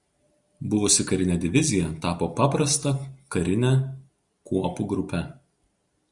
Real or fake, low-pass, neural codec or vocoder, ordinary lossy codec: real; 10.8 kHz; none; Opus, 64 kbps